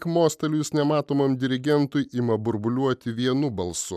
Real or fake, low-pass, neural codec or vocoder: real; 14.4 kHz; none